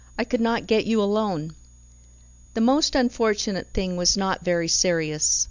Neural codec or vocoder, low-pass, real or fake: none; 7.2 kHz; real